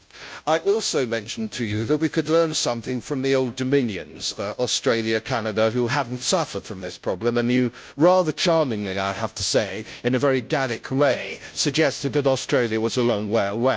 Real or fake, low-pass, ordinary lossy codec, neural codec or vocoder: fake; none; none; codec, 16 kHz, 0.5 kbps, FunCodec, trained on Chinese and English, 25 frames a second